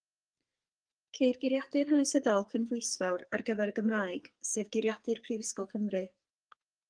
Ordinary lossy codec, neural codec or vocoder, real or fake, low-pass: Opus, 32 kbps; codec, 44.1 kHz, 2.6 kbps, SNAC; fake; 9.9 kHz